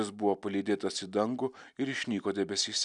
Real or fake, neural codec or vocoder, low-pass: real; none; 10.8 kHz